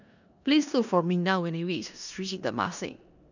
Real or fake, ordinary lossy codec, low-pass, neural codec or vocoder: fake; none; 7.2 kHz; codec, 16 kHz in and 24 kHz out, 0.9 kbps, LongCat-Audio-Codec, four codebook decoder